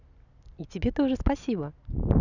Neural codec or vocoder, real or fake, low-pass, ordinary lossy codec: none; real; 7.2 kHz; none